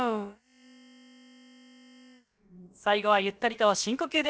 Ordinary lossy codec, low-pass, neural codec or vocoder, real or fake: none; none; codec, 16 kHz, about 1 kbps, DyCAST, with the encoder's durations; fake